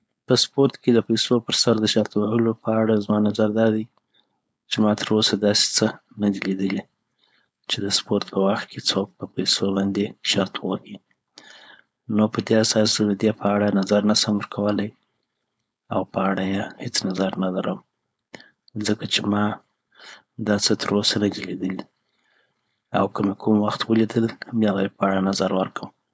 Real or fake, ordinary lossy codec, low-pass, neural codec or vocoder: fake; none; none; codec, 16 kHz, 4.8 kbps, FACodec